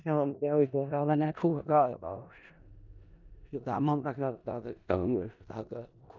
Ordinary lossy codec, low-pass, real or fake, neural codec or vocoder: none; 7.2 kHz; fake; codec, 16 kHz in and 24 kHz out, 0.4 kbps, LongCat-Audio-Codec, four codebook decoder